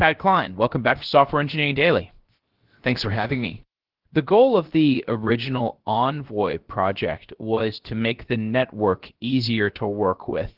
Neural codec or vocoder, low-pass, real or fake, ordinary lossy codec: codec, 16 kHz, 0.7 kbps, FocalCodec; 5.4 kHz; fake; Opus, 16 kbps